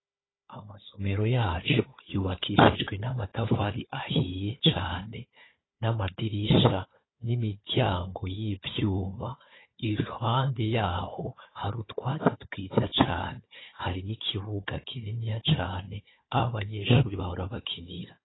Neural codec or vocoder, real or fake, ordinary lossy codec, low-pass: codec, 16 kHz, 4 kbps, FunCodec, trained on Chinese and English, 50 frames a second; fake; AAC, 16 kbps; 7.2 kHz